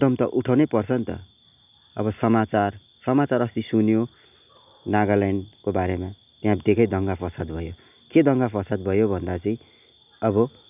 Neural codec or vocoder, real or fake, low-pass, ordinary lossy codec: none; real; 3.6 kHz; none